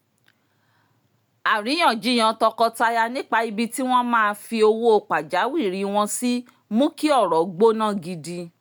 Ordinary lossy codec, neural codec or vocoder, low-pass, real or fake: none; none; none; real